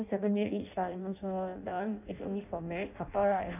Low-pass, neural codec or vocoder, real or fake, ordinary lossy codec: 3.6 kHz; codec, 16 kHz in and 24 kHz out, 0.6 kbps, FireRedTTS-2 codec; fake; none